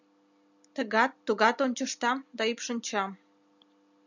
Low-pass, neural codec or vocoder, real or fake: 7.2 kHz; none; real